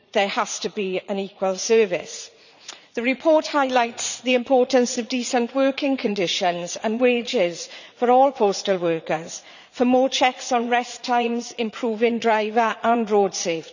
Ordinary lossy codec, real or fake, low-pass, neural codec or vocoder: none; fake; 7.2 kHz; vocoder, 44.1 kHz, 80 mel bands, Vocos